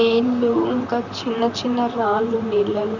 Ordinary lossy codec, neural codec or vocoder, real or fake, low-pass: none; vocoder, 44.1 kHz, 128 mel bands, Pupu-Vocoder; fake; 7.2 kHz